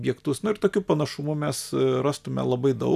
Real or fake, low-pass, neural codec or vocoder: real; 14.4 kHz; none